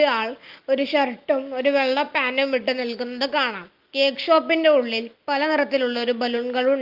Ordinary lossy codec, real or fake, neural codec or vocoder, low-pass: Opus, 32 kbps; real; none; 5.4 kHz